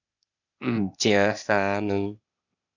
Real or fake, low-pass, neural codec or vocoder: fake; 7.2 kHz; codec, 16 kHz, 0.8 kbps, ZipCodec